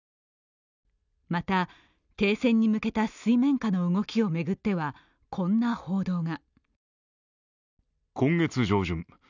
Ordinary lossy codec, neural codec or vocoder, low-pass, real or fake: none; none; 7.2 kHz; real